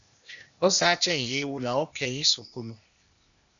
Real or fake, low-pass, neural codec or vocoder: fake; 7.2 kHz; codec, 16 kHz, 0.8 kbps, ZipCodec